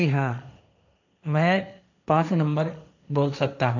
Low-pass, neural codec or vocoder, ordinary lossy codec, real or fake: 7.2 kHz; codec, 16 kHz, 1.1 kbps, Voila-Tokenizer; none; fake